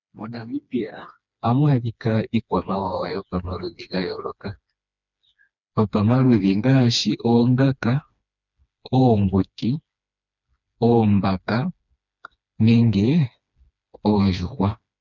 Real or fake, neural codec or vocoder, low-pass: fake; codec, 16 kHz, 2 kbps, FreqCodec, smaller model; 7.2 kHz